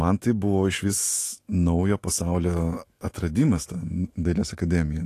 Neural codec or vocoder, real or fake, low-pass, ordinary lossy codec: autoencoder, 48 kHz, 128 numbers a frame, DAC-VAE, trained on Japanese speech; fake; 14.4 kHz; AAC, 48 kbps